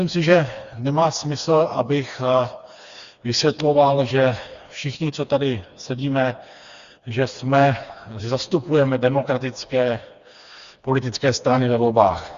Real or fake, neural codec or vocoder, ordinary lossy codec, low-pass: fake; codec, 16 kHz, 2 kbps, FreqCodec, smaller model; Opus, 64 kbps; 7.2 kHz